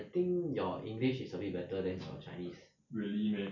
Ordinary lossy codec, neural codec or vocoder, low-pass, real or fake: none; none; 7.2 kHz; real